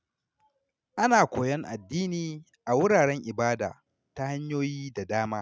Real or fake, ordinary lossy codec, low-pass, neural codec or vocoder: real; none; none; none